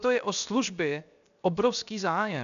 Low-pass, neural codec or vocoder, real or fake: 7.2 kHz; codec, 16 kHz, 0.7 kbps, FocalCodec; fake